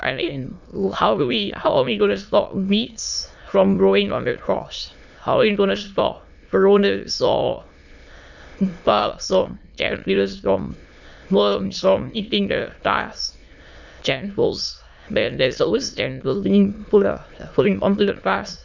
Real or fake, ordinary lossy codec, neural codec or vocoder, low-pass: fake; none; autoencoder, 22.05 kHz, a latent of 192 numbers a frame, VITS, trained on many speakers; 7.2 kHz